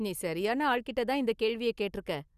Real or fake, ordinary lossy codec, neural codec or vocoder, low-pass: real; Opus, 64 kbps; none; 14.4 kHz